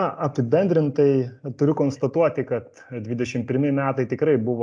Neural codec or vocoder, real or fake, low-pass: none; real; 9.9 kHz